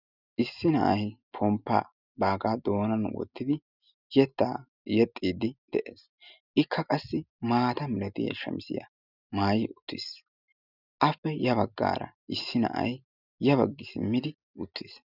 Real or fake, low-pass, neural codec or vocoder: real; 5.4 kHz; none